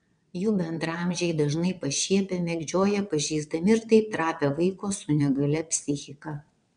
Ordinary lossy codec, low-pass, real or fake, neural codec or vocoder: MP3, 96 kbps; 9.9 kHz; fake; vocoder, 22.05 kHz, 80 mel bands, WaveNeXt